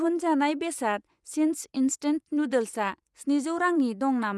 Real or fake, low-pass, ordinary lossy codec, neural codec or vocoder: fake; none; none; vocoder, 24 kHz, 100 mel bands, Vocos